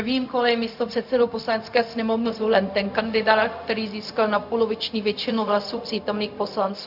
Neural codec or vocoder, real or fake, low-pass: codec, 16 kHz, 0.4 kbps, LongCat-Audio-Codec; fake; 5.4 kHz